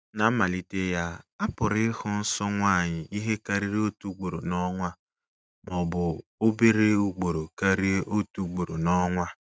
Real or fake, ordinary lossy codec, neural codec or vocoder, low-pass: real; none; none; none